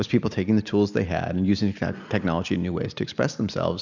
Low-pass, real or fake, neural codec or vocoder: 7.2 kHz; real; none